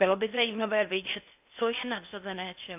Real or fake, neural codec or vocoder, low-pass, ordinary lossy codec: fake; codec, 16 kHz in and 24 kHz out, 0.6 kbps, FocalCodec, streaming, 4096 codes; 3.6 kHz; AAC, 32 kbps